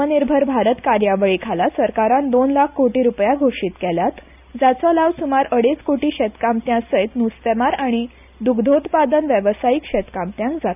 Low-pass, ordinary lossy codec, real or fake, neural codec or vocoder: 3.6 kHz; none; real; none